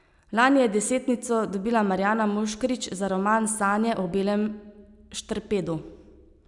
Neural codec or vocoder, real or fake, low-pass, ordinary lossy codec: none; real; 10.8 kHz; none